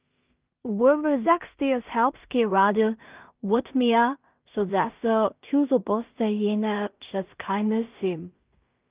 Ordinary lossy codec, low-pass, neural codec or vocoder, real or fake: Opus, 24 kbps; 3.6 kHz; codec, 16 kHz in and 24 kHz out, 0.4 kbps, LongCat-Audio-Codec, two codebook decoder; fake